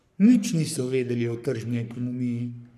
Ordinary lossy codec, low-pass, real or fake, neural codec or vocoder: none; 14.4 kHz; fake; codec, 44.1 kHz, 3.4 kbps, Pupu-Codec